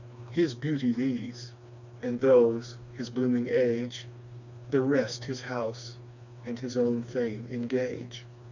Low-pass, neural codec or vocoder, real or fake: 7.2 kHz; codec, 16 kHz, 2 kbps, FreqCodec, smaller model; fake